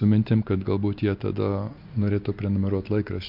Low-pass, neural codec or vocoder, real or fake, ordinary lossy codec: 5.4 kHz; none; real; MP3, 48 kbps